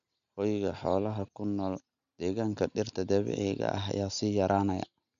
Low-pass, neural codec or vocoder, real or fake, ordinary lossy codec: 7.2 kHz; none; real; none